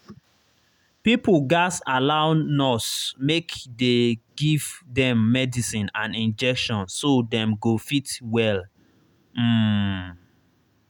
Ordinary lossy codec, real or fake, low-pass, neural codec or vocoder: none; real; 19.8 kHz; none